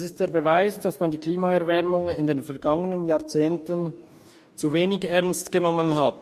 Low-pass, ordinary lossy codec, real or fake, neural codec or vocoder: 14.4 kHz; MP3, 64 kbps; fake; codec, 44.1 kHz, 2.6 kbps, DAC